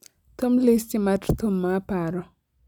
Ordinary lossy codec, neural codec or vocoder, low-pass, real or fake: none; none; 19.8 kHz; real